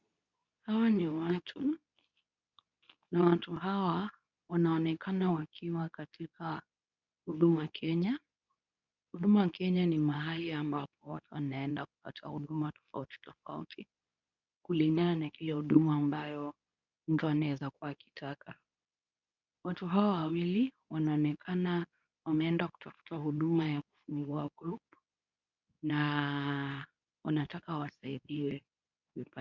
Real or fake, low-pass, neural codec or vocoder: fake; 7.2 kHz; codec, 24 kHz, 0.9 kbps, WavTokenizer, medium speech release version 2